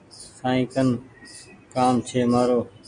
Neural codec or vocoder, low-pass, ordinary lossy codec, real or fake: none; 9.9 kHz; MP3, 64 kbps; real